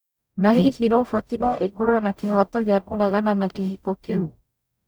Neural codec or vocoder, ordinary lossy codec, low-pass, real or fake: codec, 44.1 kHz, 0.9 kbps, DAC; none; none; fake